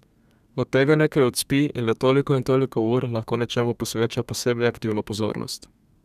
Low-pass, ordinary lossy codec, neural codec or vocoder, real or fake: 14.4 kHz; none; codec, 32 kHz, 1.9 kbps, SNAC; fake